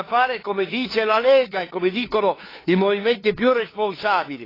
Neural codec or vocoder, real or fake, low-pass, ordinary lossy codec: codec, 16 kHz, 2 kbps, FunCodec, trained on LibriTTS, 25 frames a second; fake; 5.4 kHz; AAC, 24 kbps